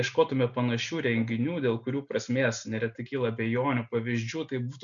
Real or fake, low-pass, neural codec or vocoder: real; 7.2 kHz; none